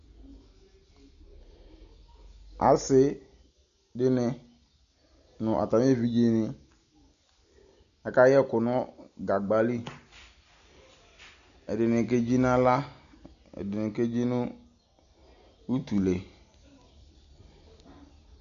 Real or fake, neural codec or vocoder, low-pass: real; none; 7.2 kHz